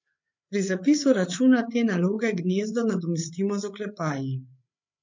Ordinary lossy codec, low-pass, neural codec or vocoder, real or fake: MP3, 48 kbps; 7.2 kHz; codec, 16 kHz, 8 kbps, FreqCodec, larger model; fake